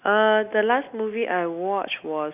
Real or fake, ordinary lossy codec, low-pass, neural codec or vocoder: real; none; 3.6 kHz; none